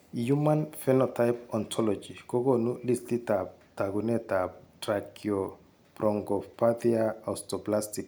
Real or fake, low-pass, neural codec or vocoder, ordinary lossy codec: real; none; none; none